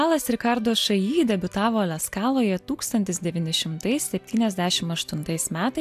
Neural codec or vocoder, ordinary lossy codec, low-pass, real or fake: none; AAC, 96 kbps; 14.4 kHz; real